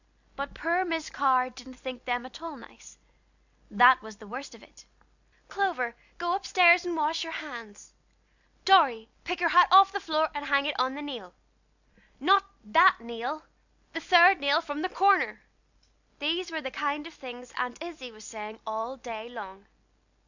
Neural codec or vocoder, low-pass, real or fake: none; 7.2 kHz; real